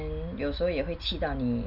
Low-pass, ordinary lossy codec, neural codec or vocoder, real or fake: 5.4 kHz; none; none; real